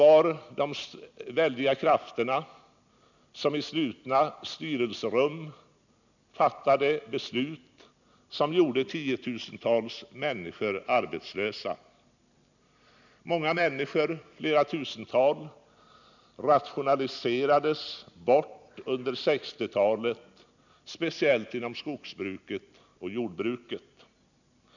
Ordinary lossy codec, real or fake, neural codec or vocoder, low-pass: none; real; none; 7.2 kHz